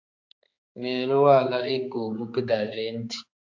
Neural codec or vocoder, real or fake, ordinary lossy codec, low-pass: codec, 16 kHz, 4 kbps, X-Codec, HuBERT features, trained on balanced general audio; fake; AAC, 48 kbps; 7.2 kHz